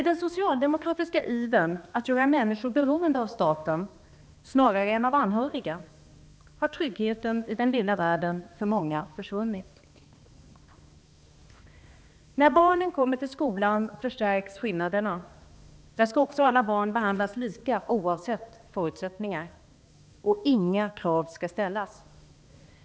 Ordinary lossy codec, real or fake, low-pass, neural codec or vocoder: none; fake; none; codec, 16 kHz, 2 kbps, X-Codec, HuBERT features, trained on balanced general audio